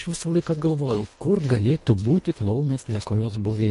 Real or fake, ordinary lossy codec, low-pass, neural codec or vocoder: fake; MP3, 48 kbps; 10.8 kHz; codec, 24 kHz, 1.5 kbps, HILCodec